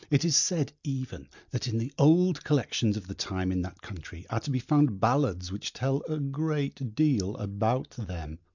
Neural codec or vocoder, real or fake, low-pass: none; real; 7.2 kHz